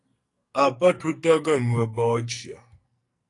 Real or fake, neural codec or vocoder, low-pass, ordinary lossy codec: fake; codec, 44.1 kHz, 2.6 kbps, SNAC; 10.8 kHz; AAC, 48 kbps